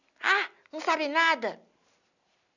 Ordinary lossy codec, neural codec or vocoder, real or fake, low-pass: none; none; real; 7.2 kHz